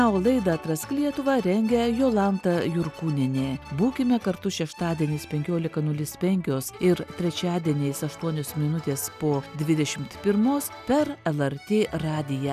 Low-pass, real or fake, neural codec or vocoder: 14.4 kHz; real; none